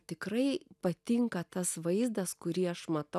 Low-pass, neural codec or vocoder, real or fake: 14.4 kHz; none; real